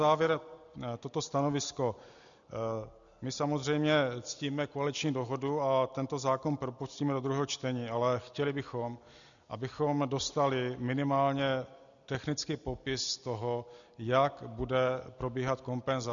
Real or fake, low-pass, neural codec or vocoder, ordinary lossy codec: real; 7.2 kHz; none; MP3, 96 kbps